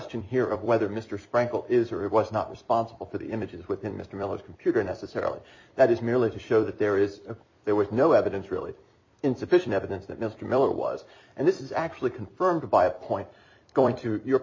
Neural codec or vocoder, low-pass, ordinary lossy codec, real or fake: vocoder, 44.1 kHz, 128 mel bands every 256 samples, BigVGAN v2; 7.2 kHz; MP3, 32 kbps; fake